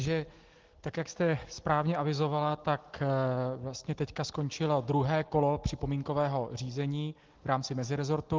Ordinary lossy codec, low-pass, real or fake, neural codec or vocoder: Opus, 16 kbps; 7.2 kHz; real; none